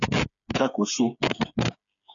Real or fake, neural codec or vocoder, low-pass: fake; codec, 16 kHz, 8 kbps, FreqCodec, smaller model; 7.2 kHz